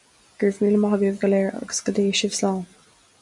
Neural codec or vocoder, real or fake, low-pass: none; real; 10.8 kHz